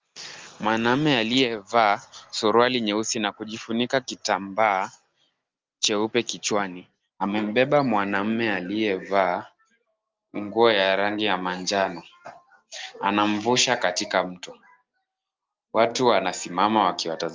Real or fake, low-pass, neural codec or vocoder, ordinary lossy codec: real; 7.2 kHz; none; Opus, 24 kbps